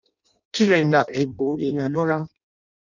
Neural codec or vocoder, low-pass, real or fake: codec, 16 kHz in and 24 kHz out, 0.6 kbps, FireRedTTS-2 codec; 7.2 kHz; fake